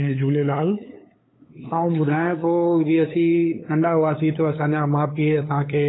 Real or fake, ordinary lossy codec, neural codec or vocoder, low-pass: fake; AAC, 16 kbps; codec, 16 kHz, 8 kbps, FunCodec, trained on LibriTTS, 25 frames a second; 7.2 kHz